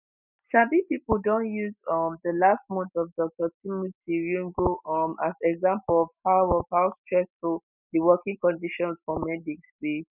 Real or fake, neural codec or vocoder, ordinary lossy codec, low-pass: real; none; none; 3.6 kHz